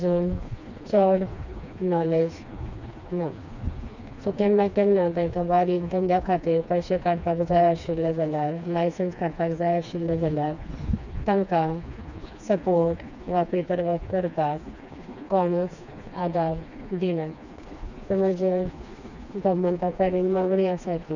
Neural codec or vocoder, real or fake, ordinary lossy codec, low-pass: codec, 16 kHz, 2 kbps, FreqCodec, smaller model; fake; none; 7.2 kHz